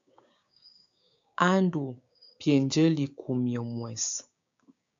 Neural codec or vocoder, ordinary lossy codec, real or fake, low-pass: codec, 16 kHz, 6 kbps, DAC; AAC, 64 kbps; fake; 7.2 kHz